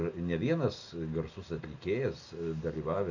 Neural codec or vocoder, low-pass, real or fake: none; 7.2 kHz; real